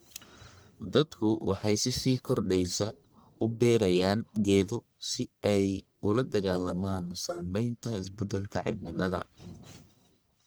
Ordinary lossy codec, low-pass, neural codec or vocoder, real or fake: none; none; codec, 44.1 kHz, 1.7 kbps, Pupu-Codec; fake